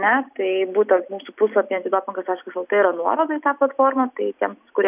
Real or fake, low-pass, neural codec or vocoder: real; 3.6 kHz; none